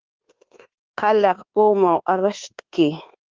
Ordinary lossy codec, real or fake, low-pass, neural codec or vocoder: Opus, 16 kbps; fake; 7.2 kHz; codec, 24 kHz, 1.2 kbps, DualCodec